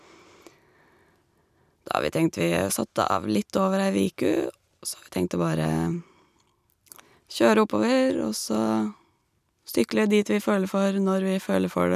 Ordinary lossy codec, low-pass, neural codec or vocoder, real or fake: none; 14.4 kHz; none; real